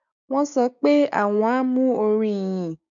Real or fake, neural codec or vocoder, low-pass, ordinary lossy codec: fake; codec, 16 kHz, 6 kbps, DAC; 7.2 kHz; MP3, 64 kbps